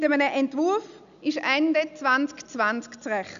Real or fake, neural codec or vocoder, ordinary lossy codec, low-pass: real; none; none; 7.2 kHz